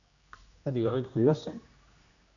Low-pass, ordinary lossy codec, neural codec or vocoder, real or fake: 7.2 kHz; MP3, 96 kbps; codec, 16 kHz, 1 kbps, X-Codec, HuBERT features, trained on general audio; fake